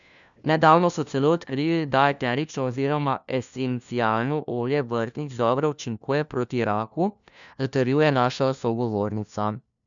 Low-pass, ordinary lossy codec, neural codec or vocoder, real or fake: 7.2 kHz; none; codec, 16 kHz, 1 kbps, FunCodec, trained on LibriTTS, 50 frames a second; fake